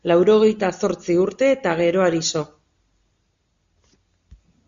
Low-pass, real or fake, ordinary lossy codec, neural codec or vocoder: 7.2 kHz; real; Opus, 64 kbps; none